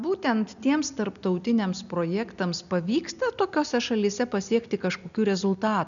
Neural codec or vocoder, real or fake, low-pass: none; real; 7.2 kHz